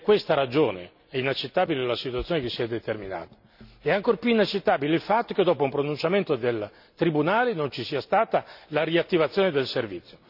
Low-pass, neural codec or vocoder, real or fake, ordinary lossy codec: 5.4 kHz; none; real; none